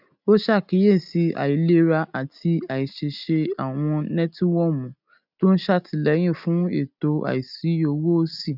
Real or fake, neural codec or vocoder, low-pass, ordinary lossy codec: real; none; 5.4 kHz; none